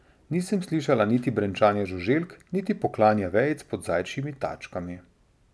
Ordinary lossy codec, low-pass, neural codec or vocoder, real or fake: none; none; none; real